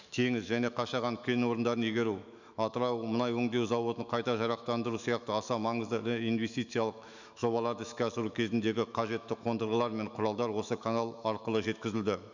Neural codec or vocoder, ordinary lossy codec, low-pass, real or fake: autoencoder, 48 kHz, 128 numbers a frame, DAC-VAE, trained on Japanese speech; none; 7.2 kHz; fake